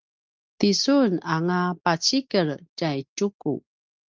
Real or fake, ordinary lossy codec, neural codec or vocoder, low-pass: real; Opus, 24 kbps; none; 7.2 kHz